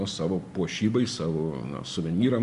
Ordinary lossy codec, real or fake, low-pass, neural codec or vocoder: MP3, 64 kbps; real; 10.8 kHz; none